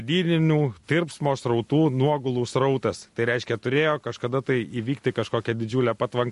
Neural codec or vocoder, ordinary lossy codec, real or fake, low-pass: none; MP3, 48 kbps; real; 14.4 kHz